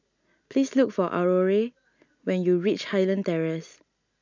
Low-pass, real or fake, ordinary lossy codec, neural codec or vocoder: 7.2 kHz; real; MP3, 64 kbps; none